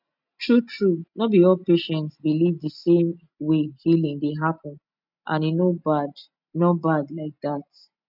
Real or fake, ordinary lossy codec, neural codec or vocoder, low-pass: real; none; none; 5.4 kHz